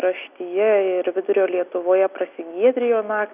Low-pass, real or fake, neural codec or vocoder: 3.6 kHz; real; none